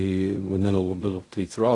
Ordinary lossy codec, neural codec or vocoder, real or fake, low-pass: AAC, 48 kbps; codec, 16 kHz in and 24 kHz out, 0.4 kbps, LongCat-Audio-Codec, fine tuned four codebook decoder; fake; 10.8 kHz